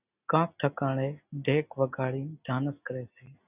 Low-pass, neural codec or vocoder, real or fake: 3.6 kHz; none; real